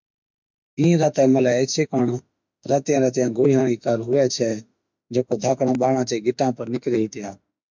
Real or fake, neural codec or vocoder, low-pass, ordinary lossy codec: fake; autoencoder, 48 kHz, 32 numbers a frame, DAC-VAE, trained on Japanese speech; 7.2 kHz; MP3, 64 kbps